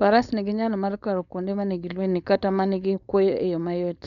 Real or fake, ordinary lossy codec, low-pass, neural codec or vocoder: fake; none; 7.2 kHz; codec, 16 kHz, 4.8 kbps, FACodec